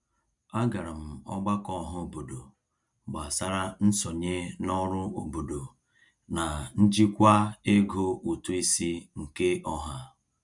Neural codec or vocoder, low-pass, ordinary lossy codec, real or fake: none; 10.8 kHz; none; real